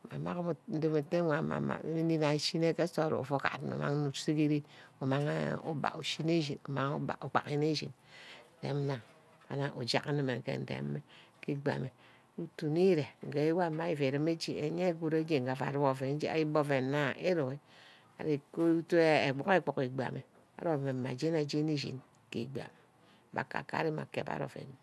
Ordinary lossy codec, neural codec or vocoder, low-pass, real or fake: none; none; none; real